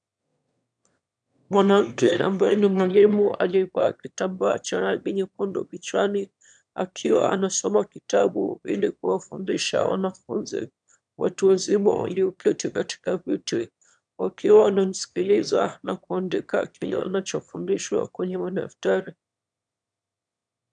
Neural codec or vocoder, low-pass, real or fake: autoencoder, 22.05 kHz, a latent of 192 numbers a frame, VITS, trained on one speaker; 9.9 kHz; fake